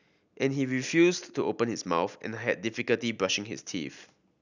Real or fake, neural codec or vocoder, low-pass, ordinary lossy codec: real; none; 7.2 kHz; none